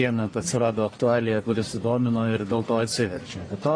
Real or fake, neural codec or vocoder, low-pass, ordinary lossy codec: fake; codec, 44.1 kHz, 1.7 kbps, Pupu-Codec; 9.9 kHz; AAC, 32 kbps